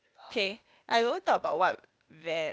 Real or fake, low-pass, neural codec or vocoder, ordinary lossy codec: fake; none; codec, 16 kHz, 0.8 kbps, ZipCodec; none